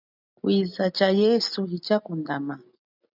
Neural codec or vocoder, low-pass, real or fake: none; 5.4 kHz; real